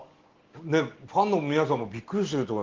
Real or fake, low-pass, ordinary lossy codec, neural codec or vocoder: real; 7.2 kHz; Opus, 16 kbps; none